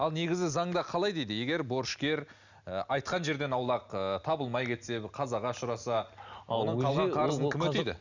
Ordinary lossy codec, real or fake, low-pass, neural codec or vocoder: none; real; 7.2 kHz; none